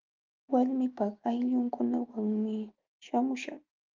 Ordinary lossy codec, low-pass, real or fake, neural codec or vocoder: Opus, 24 kbps; 7.2 kHz; fake; autoencoder, 48 kHz, 128 numbers a frame, DAC-VAE, trained on Japanese speech